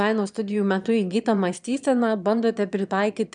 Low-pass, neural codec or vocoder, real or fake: 9.9 kHz; autoencoder, 22.05 kHz, a latent of 192 numbers a frame, VITS, trained on one speaker; fake